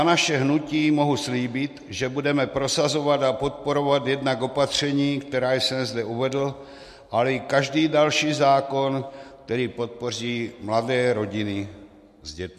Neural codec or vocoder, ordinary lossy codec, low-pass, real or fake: none; MP3, 64 kbps; 14.4 kHz; real